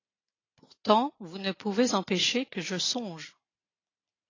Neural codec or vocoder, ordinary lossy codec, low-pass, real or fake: none; AAC, 32 kbps; 7.2 kHz; real